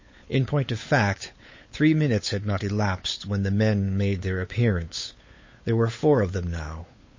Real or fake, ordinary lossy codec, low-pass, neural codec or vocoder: fake; MP3, 32 kbps; 7.2 kHz; codec, 16 kHz, 8 kbps, FunCodec, trained on Chinese and English, 25 frames a second